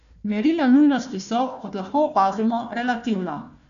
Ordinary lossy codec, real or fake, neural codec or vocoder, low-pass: none; fake; codec, 16 kHz, 1 kbps, FunCodec, trained on Chinese and English, 50 frames a second; 7.2 kHz